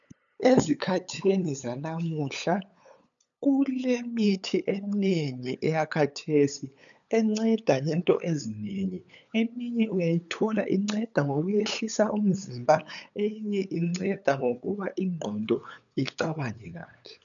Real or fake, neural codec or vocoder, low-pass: fake; codec, 16 kHz, 8 kbps, FunCodec, trained on LibriTTS, 25 frames a second; 7.2 kHz